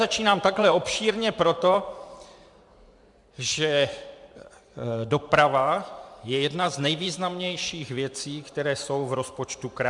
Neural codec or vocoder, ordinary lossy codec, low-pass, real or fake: vocoder, 44.1 kHz, 128 mel bands every 512 samples, BigVGAN v2; MP3, 96 kbps; 10.8 kHz; fake